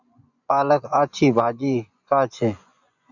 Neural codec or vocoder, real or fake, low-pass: vocoder, 24 kHz, 100 mel bands, Vocos; fake; 7.2 kHz